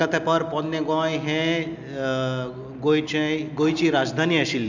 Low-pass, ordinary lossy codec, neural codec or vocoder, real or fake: 7.2 kHz; none; none; real